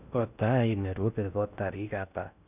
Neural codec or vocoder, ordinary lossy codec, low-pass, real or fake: codec, 16 kHz in and 24 kHz out, 0.6 kbps, FocalCodec, streaming, 2048 codes; none; 3.6 kHz; fake